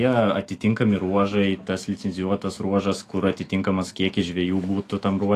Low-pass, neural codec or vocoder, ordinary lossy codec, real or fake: 14.4 kHz; autoencoder, 48 kHz, 128 numbers a frame, DAC-VAE, trained on Japanese speech; AAC, 48 kbps; fake